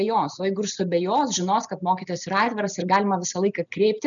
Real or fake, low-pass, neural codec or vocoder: real; 7.2 kHz; none